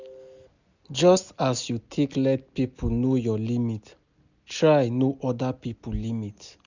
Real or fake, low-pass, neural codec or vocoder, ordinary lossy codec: real; 7.2 kHz; none; none